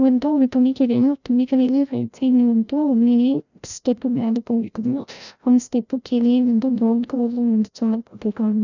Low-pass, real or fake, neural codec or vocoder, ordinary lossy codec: 7.2 kHz; fake; codec, 16 kHz, 0.5 kbps, FreqCodec, larger model; none